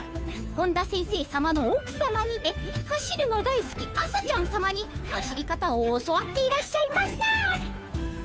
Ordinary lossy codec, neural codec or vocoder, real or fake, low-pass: none; codec, 16 kHz, 2 kbps, FunCodec, trained on Chinese and English, 25 frames a second; fake; none